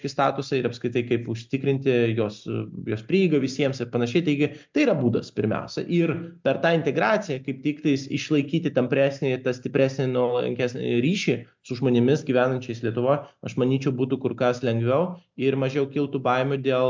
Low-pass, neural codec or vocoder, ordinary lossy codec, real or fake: 7.2 kHz; none; MP3, 64 kbps; real